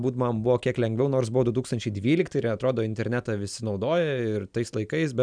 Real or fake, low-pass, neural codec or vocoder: real; 9.9 kHz; none